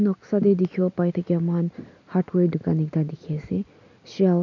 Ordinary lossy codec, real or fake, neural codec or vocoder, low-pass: none; real; none; 7.2 kHz